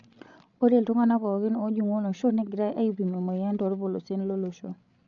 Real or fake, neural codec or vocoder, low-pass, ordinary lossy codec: fake; codec, 16 kHz, 16 kbps, FreqCodec, larger model; 7.2 kHz; MP3, 96 kbps